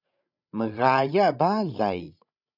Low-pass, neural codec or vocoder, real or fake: 5.4 kHz; codec, 16 kHz, 16 kbps, FreqCodec, larger model; fake